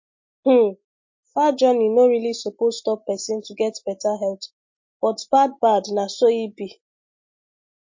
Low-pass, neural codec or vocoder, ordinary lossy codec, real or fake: 7.2 kHz; none; MP3, 32 kbps; real